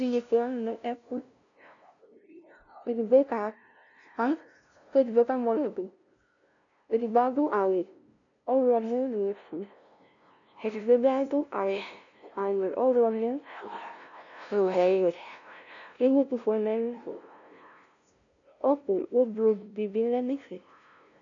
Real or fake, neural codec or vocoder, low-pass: fake; codec, 16 kHz, 0.5 kbps, FunCodec, trained on LibriTTS, 25 frames a second; 7.2 kHz